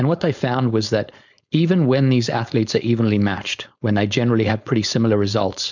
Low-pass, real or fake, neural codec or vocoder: 7.2 kHz; fake; codec, 16 kHz, 4.8 kbps, FACodec